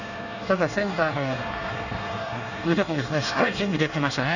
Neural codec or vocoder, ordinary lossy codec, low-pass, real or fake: codec, 24 kHz, 1 kbps, SNAC; none; 7.2 kHz; fake